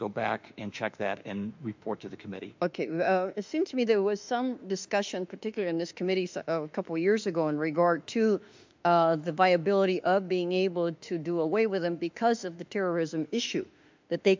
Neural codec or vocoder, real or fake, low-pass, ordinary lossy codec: autoencoder, 48 kHz, 32 numbers a frame, DAC-VAE, trained on Japanese speech; fake; 7.2 kHz; MP3, 64 kbps